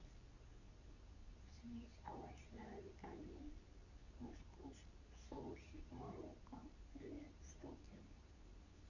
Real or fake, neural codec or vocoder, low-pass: fake; codec, 24 kHz, 0.9 kbps, WavTokenizer, medium speech release version 2; 7.2 kHz